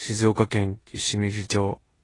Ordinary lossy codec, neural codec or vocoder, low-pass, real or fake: AAC, 32 kbps; codec, 16 kHz in and 24 kHz out, 0.9 kbps, LongCat-Audio-Codec, four codebook decoder; 10.8 kHz; fake